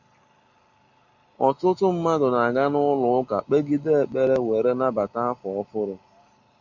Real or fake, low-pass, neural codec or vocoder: real; 7.2 kHz; none